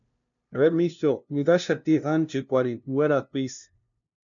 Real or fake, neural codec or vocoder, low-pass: fake; codec, 16 kHz, 0.5 kbps, FunCodec, trained on LibriTTS, 25 frames a second; 7.2 kHz